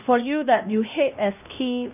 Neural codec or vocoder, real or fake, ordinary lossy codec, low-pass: codec, 16 kHz, 1 kbps, X-Codec, HuBERT features, trained on LibriSpeech; fake; none; 3.6 kHz